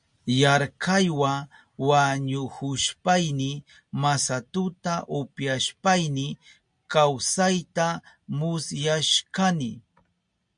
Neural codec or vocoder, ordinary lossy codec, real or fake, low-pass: none; MP3, 64 kbps; real; 9.9 kHz